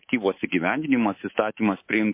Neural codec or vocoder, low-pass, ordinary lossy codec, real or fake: codec, 24 kHz, 3.1 kbps, DualCodec; 3.6 kHz; MP3, 24 kbps; fake